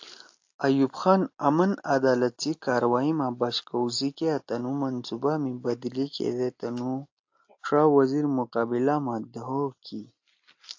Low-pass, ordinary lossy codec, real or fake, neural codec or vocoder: 7.2 kHz; AAC, 48 kbps; real; none